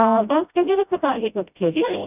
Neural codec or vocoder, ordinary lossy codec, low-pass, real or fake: codec, 16 kHz, 0.5 kbps, FreqCodec, smaller model; none; 3.6 kHz; fake